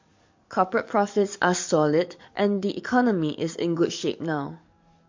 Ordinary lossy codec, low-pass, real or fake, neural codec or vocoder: MP3, 48 kbps; 7.2 kHz; fake; codec, 44.1 kHz, 7.8 kbps, DAC